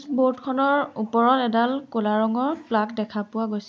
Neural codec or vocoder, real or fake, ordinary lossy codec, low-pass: none; real; none; none